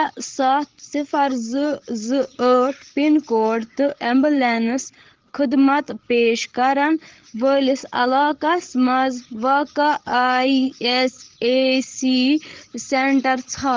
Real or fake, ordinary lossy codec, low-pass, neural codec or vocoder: fake; Opus, 16 kbps; 7.2 kHz; codec, 16 kHz, 8 kbps, FreqCodec, larger model